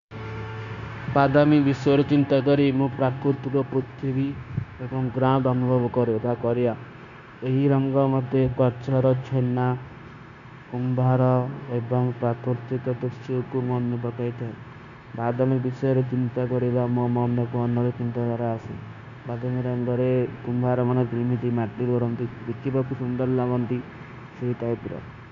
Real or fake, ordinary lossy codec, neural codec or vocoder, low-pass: fake; none; codec, 16 kHz, 0.9 kbps, LongCat-Audio-Codec; 7.2 kHz